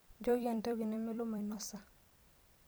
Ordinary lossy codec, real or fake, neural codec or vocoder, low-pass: none; fake; vocoder, 44.1 kHz, 128 mel bands every 256 samples, BigVGAN v2; none